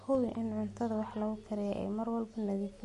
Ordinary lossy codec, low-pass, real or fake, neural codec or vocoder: MP3, 48 kbps; 14.4 kHz; real; none